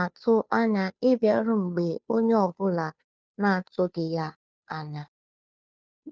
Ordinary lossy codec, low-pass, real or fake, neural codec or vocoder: none; none; fake; codec, 16 kHz, 2 kbps, FunCodec, trained on Chinese and English, 25 frames a second